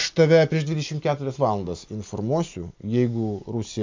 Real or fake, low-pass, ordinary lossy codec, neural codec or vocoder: real; 7.2 kHz; MP3, 64 kbps; none